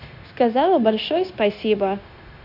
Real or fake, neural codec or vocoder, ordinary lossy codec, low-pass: fake; codec, 16 kHz, 0.9 kbps, LongCat-Audio-Codec; none; 5.4 kHz